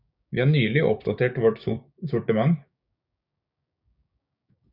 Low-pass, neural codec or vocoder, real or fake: 5.4 kHz; codec, 16 kHz, 6 kbps, DAC; fake